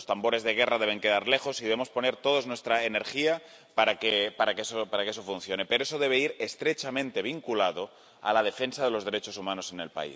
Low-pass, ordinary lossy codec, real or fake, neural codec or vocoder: none; none; real; none